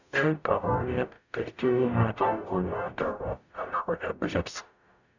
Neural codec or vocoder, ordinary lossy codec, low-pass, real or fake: codec, 44.1 kHz, 0.9 kbps, DAC; none; 7.2 kHz; fake